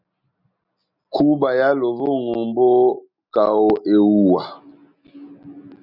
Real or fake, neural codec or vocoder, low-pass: real; none; 5.4 kHz